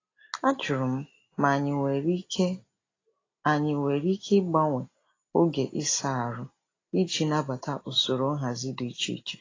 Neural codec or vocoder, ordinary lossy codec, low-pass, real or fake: none; AAC, 32 kbps; 7.2 kHz; real